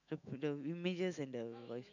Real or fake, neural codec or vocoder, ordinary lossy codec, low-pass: fake; autoencoder, 48 kHz, 128 numbers a frame, DAC-VAE, trained on Japanese speech; none; 7.2 kHz